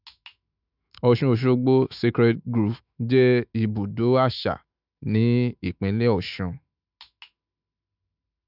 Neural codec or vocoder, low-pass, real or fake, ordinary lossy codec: autoencoder, 48 kHz, 128 numbers a frame, DAC-VAE, trained on Japanese speech; 5.4 kHz; fake; none